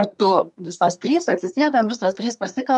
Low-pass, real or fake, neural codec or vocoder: 10.8 kHz; fake; codec, 24 kHz, 1 kbps, SNAC